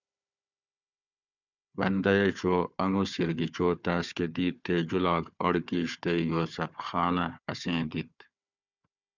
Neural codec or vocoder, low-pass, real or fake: codec, 16 kHz, 4 kbps, FunCodec, trained on Chinese and English, 50 frames a second; 7.2 kHz; fake